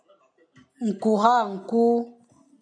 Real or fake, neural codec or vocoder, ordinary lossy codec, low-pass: real; none; MP3, 64 kbps; 9.9 kHz